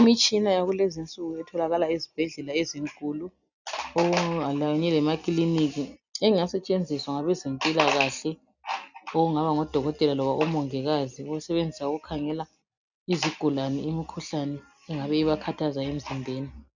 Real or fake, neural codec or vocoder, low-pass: real; none; 7.2 kHz